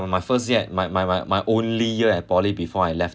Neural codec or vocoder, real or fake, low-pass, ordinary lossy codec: none; real; none; none